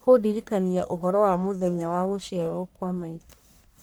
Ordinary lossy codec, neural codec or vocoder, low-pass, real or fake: none; codec, 44.1 kHz, 1.7 kbps, Pupu-Codec; none; fake